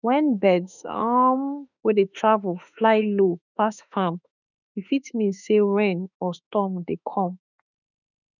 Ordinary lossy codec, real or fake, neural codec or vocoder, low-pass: none; fake; autoencoder, 48 kHz, 32 numbers a frame, DAC-VAE, trained on Japanese speech; 7.2 kHz